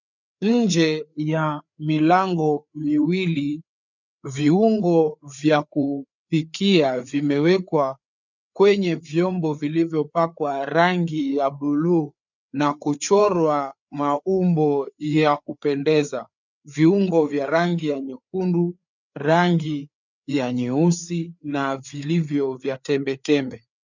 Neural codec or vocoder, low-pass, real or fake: codec, 16 kHz, 4 kbps, FreqCodec, larger model; 7.2 kHz; fake